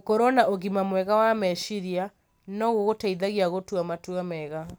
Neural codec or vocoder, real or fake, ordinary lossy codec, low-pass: none; real; none; none